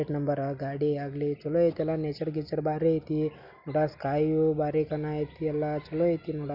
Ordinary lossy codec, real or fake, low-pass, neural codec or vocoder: none; real; 5.4 kHz; none